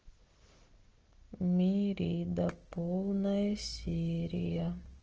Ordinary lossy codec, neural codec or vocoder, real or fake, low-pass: Opus, 16 kbps; none; real; 7.2 kHz